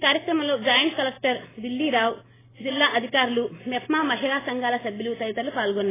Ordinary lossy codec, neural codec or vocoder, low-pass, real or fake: AAC, 16 kbps; none; 3.6 kHz; real